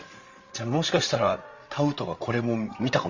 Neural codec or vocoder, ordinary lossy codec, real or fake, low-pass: codec, 16 kHz, 16 kbps, FreqCodec, larger model; Opus, 64 kbps; fake; 7.2 kHz